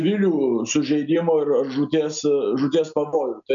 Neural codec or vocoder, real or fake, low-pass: none; real; 7.2 kHz